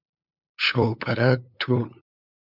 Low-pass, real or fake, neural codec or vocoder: 5.4 kHz; fake; codec, 16 kHz, 8 kbps, FunCodec, trained on LibriTTS, 25 frames a second